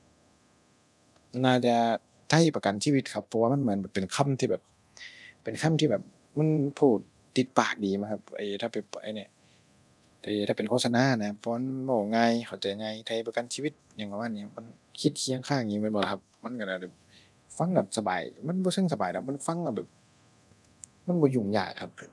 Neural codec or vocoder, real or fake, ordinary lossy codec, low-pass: codec, 24 kHz, 0.9 kbps, DualCodec; fake; none; none